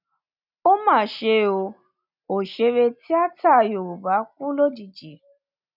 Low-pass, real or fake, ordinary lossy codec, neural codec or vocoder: 5.4 kHz; real; none; none